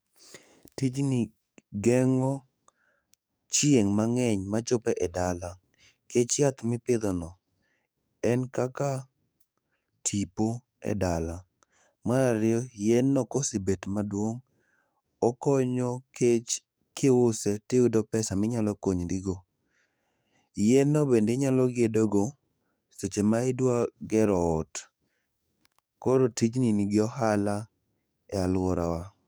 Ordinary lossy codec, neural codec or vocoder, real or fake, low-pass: none; codec, 44.1 kHz, 7.8 kbps, DAC; fake; none